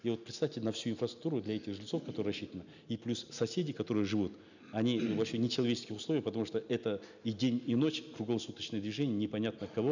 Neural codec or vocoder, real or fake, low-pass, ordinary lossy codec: none; real; 7.2 kHz; none